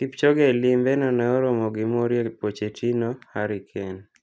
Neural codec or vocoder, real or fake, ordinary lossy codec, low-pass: none; real; none; none